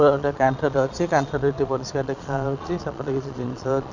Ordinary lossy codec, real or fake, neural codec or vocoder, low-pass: none; fake; vocoder, 22.05 kHz, 80 mel bands, Vocos; 7.2 kHz